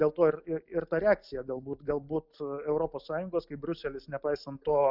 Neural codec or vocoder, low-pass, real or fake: none; 5.4 kHz; real